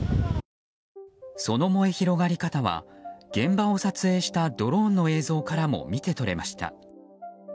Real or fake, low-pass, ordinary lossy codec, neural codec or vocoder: real; none; none; none